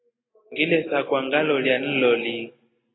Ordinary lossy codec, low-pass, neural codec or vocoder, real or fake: AAC, 16 kbps; 7.2 kHz; none; real